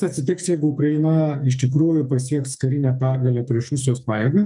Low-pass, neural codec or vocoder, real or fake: 10.8 kHz; codec, 44.1 kHz, 2.6 kbps, SNAC; fake